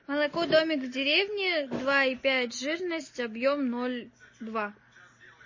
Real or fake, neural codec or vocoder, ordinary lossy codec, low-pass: real; none; MP3, 32 kbps; 7.2 kHz